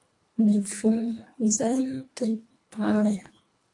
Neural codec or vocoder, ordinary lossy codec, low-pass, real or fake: codec, 24 kHz, 1.5 kbps, HILCodec; AAC, 48 kbps; 10.8 kHz; fake